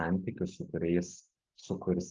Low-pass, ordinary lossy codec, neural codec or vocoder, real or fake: 7.2 kHz; Opus, 24 kbps; none; real